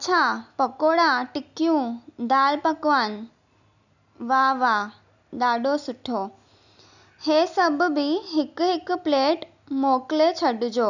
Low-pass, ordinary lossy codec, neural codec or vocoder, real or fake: 7.2 kHz; none; none; real